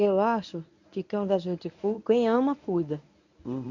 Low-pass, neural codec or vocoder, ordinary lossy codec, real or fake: 7.2 kHz; codec, 24 kHz, 0.9 kbps, WavTokenizer, medium speech release version 1; none; fake